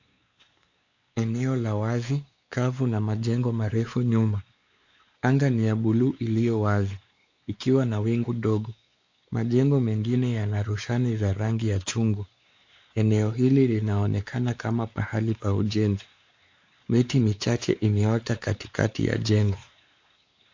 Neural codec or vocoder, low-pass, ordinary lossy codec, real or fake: codec, 16 kHz, 4 kbps, X-Codec, WavLM features, trained on Multilingual LibriSpeech; 7.2 kHz; AAC, 48 kbps; fake